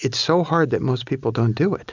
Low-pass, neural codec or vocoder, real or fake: 7.2 kHz; none; real